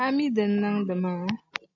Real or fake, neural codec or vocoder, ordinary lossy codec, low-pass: real; none; AAC, 48 kbps; 7.2 kHz